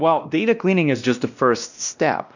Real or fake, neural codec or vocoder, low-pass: fake; codec, 16 kHz, 1 kbps, X-Codec, WavLM features, trained on Multilingual LibriSpeech; 7.2 kHz